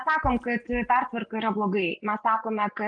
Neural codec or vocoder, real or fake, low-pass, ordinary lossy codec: none; real; 9.9 kHz; Opus, 32 kbps